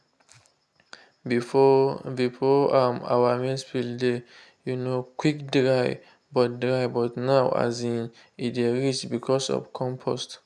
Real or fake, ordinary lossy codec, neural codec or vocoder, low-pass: real; none; none; none